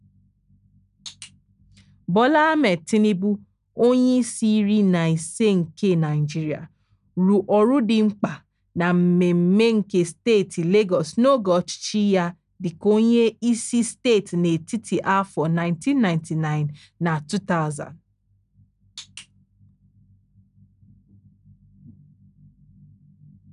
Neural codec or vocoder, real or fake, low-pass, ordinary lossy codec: none; real; 10.8 kHz; none